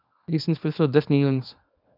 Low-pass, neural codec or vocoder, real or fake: 5.4 kHz; codec, 24 kHz, 0.9 kbps, WavTokenizer, small release; fake